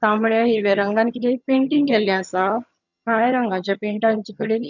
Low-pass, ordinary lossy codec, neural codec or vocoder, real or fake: 7.2 kHz; none; vocoder, 22.05 kHz, 80 mel bands, HiFi-GAN; fake